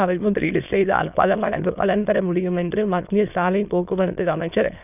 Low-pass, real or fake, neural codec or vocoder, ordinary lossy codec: 3.6 kHz; fake; autoencoder, 22.05 kHz, a latent of 192 numbers a frame, VITS, trained on many speakers; none